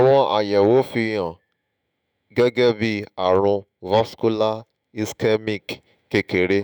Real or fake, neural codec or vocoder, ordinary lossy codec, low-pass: fake; autoencoder, 48 kHz, 128 numbers a frame, DAC-VAE, trained on Japanese speech; none; none